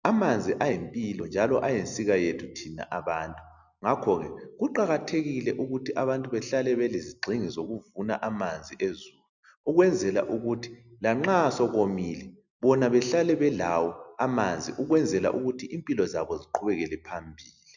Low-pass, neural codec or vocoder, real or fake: 7.2 kHz; none; real